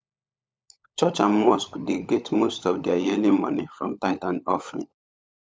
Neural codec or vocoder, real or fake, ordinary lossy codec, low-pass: codec, 16 kHz, 16 kbps, FunCodec, trained on LibriTTS, 50 frames a second; fake; none; none